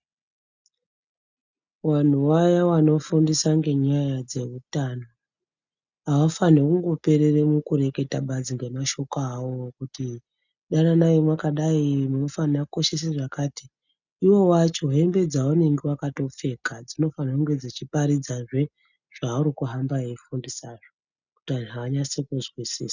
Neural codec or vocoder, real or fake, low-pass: none; real; 7.2 kHz